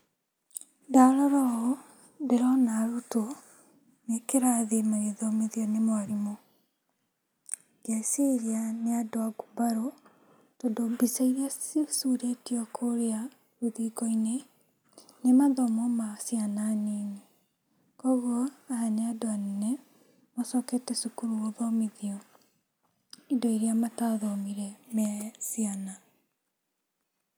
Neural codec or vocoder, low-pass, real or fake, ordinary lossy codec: none; none; real; none